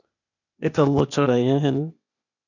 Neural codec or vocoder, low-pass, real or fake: codec, 16 kHz, 0.8 kbps, ZipCodec; 7.2 kHz; fake